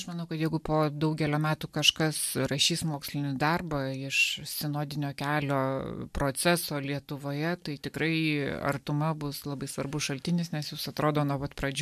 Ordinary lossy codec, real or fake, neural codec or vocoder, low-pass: MP3, 96 kbps; real; none; 14.4 kHz